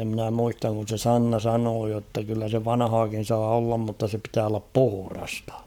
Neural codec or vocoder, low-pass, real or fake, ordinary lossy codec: codec, 44.1 kHz, 7.8 kbps, Pupu-Codec; 19.8 kHz; fake; none